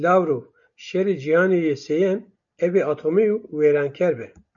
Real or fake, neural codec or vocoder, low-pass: real; none; 7.2 kHz